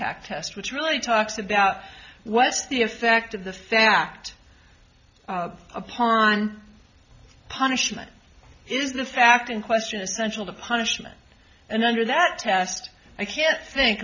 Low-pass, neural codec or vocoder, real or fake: 7.2 kHz; none; real